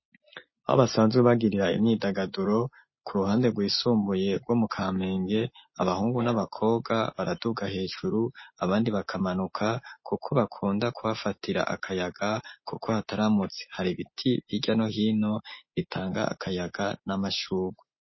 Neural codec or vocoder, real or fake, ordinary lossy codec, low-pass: none; real; MP3, 24 kbps; 7.2 kHz